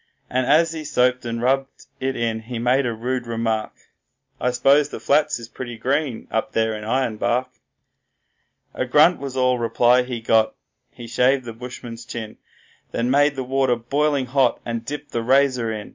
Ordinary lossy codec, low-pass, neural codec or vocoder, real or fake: MP3, 64 kbps; 7.2 kHz; none; real